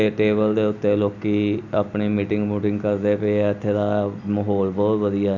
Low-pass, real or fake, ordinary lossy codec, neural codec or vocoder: 7.2 kHz; real; none; none